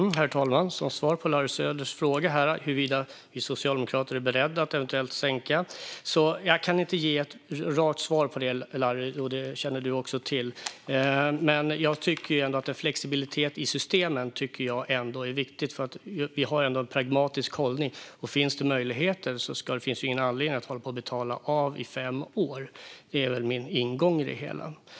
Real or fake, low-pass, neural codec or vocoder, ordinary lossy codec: real; none; none; none